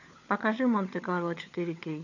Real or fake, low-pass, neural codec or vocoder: fake; 7.2 kHz; codec, 16 kHz, 16 kbps, FunCodec, trained on LibriTTS, 50 frames a second